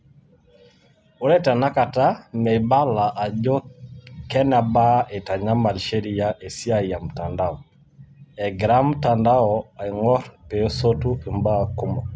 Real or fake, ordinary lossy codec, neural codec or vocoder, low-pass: real; none; none; none